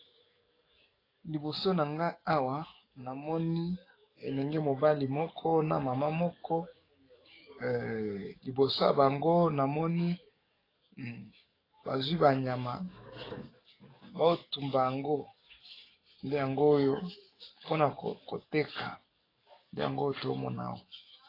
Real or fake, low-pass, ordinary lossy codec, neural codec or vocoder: fake; 5.4 kHz; AAC, 24 kbps; codec, 44.1 kHz, 7.8 kbps, DAC